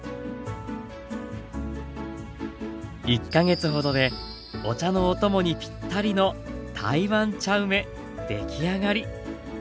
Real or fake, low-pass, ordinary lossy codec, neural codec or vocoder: real; none; none; none